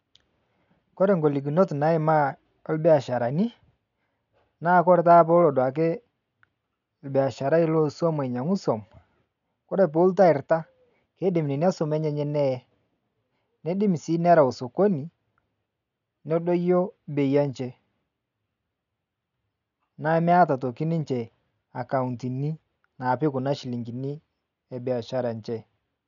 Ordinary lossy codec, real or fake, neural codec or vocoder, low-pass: none; real; none; 7.2 kHz